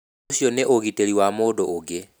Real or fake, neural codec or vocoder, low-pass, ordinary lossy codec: real; none; none; none